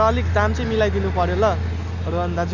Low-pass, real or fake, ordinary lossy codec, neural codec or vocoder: 7.2 kHz; real; none; none